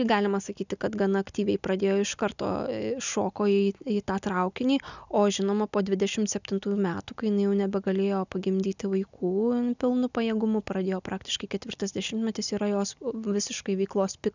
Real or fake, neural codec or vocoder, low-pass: real; none; 7.2 kHz